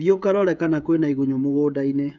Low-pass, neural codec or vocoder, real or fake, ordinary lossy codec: 7.2 kHz; codec, 16 kHz, 16 kbps, FreqCodec, smaller model; fake; none